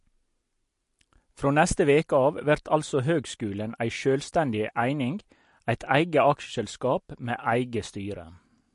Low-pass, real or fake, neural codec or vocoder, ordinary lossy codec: 14.4 kHz; real; none; MP3, 48 kbps